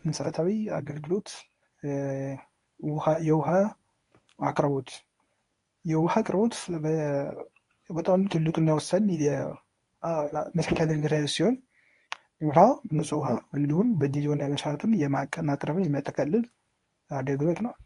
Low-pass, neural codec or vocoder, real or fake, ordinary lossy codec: 10.8 kHz; codec, 24 kHz, 0.9 kbps, WavTokenizer, medium speech release version 1; fake; AAC, 48 kbps